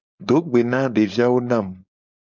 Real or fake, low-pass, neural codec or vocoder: fake; 7.2 kHz; codec, 16 kHz, 4.8 kbps, FACodec